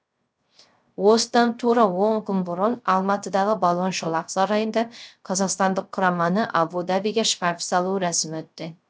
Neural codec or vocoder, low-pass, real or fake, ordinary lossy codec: codec, 16 kHz, 0.3 kbps, FocalCodec; none; fake; none